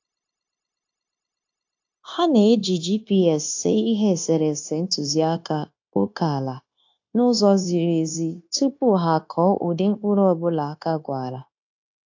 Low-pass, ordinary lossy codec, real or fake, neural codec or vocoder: 7.2 kHz; AAC, 48 kbps; fake; codec, 16 kHz, 0.9 kbps, LongCat-Audio-Codec